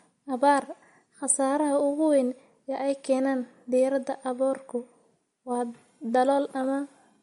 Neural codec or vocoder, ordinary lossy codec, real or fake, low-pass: none; MP3, 48 kbps; real; 19.8 kHz